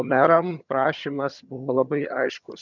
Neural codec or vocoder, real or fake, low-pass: vocoder, 22.05 kHz, 80 mel bands, HiFi-GAN; fake; 7.2 kHz